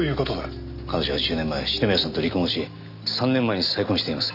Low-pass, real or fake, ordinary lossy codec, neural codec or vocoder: 5.4 kHz; real; AAC, 48 kbps; none